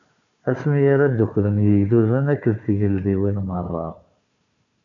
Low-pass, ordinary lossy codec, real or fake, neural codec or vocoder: 7.2 kHz; MP3, 96 kbps; fake; codec, 16 kHz, 4 kbps, FunCodec, trained on Chinese and English, 50 frames a second